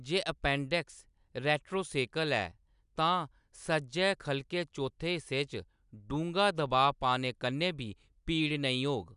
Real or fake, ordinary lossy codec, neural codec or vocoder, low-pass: real; none; none; 10.8 kHz